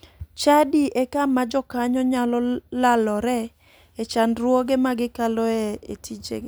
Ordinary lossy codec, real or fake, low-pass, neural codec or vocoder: none; real; none; none